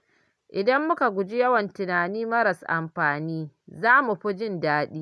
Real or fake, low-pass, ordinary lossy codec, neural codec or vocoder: real; none; none; none